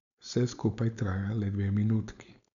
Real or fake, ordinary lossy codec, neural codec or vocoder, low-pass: fake; none; codec, 16 kHz, 4.8 kbps, FACodec; 7.2 kHz